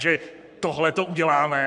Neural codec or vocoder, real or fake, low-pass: codec, 44.1 kHz, 7.8 kbps, Pupu-Codec; fake; 10.8 kHz